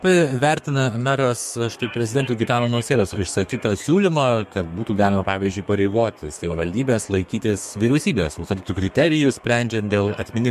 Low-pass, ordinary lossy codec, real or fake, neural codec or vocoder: 14.4 kHz; MP3, 64 kbps; fake; codec, 32 kHz, 1.9 kbps, SNAC